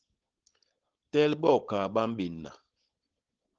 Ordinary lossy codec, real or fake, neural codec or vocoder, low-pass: Opus, 16 kbps; fake; codec, 16 kHz, 4.8 kbps, FACodec; 7.2 kHz